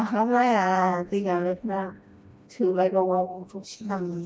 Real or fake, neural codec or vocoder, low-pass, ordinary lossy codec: fake; codec, 16 kHz, 1 kbps, FreqCodec, smaller model; none; none